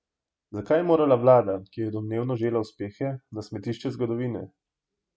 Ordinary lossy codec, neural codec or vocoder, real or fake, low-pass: none; none; real; none